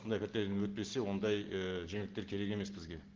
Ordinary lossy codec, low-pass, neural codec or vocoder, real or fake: Opus, 16 kbps; 7.2 kHz; none; real